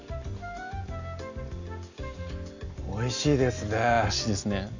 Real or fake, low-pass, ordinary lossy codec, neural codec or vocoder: real; 7.2 kHz; none; none